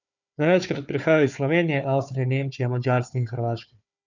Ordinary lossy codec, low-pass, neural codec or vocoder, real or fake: none; 7.2 kHz; codec, 16 kHz, 4 kbps, FunCodec, trained on Chinese and English, 50 frames a second; fake